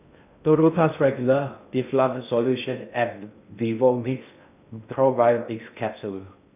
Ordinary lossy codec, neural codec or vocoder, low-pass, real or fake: none; codec, 16 kHz in and 24 kHz out, 0.6 kbps, FocalCodec, streaming, 2048 codes; 3.6 kHz; fake